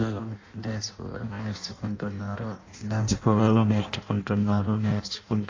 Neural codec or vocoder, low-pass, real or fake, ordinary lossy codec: codec, 16 kHz in and 24 kHz out, 0.6 kbps, FireRedTTS-2 codec; 7.2 kHz; fake; AAC, 32 kbps